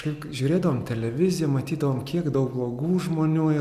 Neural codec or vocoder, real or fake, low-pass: none; real; 14.4 kHz